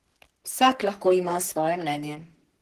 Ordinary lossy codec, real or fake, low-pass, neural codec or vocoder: Opus, 16 kbps; fake; 14.4 kHz; codec, 44.1 kHz, 3.4 kbps, Pupu-Codec